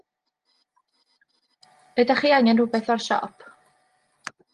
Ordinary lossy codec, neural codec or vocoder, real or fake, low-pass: Opus, 24 kbps; none; real; 10.8 kHz